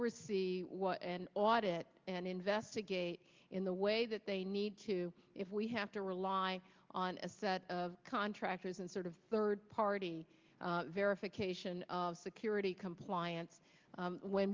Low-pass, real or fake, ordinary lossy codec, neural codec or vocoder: 7.2 kHz; real; Opus, 24 kbps; none